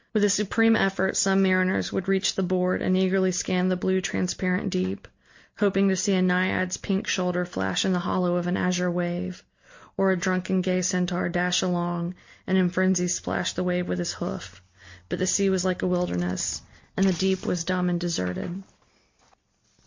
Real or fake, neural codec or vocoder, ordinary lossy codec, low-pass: real; none; MP3, 48 kbps; 7.2 kHz